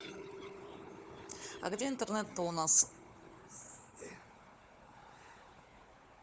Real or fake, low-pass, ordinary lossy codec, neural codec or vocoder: fake; none; none; codec, 16 kHz, 4 kbps, FunCodec, trained on Chinese and English, 50 frames a second